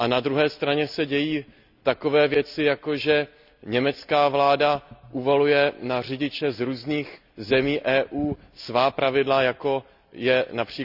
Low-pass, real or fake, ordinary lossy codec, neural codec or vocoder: 5.4 kHz; real; none; none